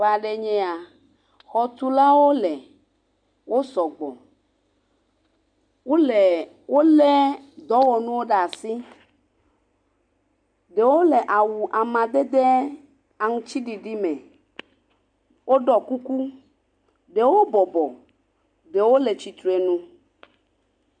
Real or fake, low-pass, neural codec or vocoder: real; 9.9 kHz; none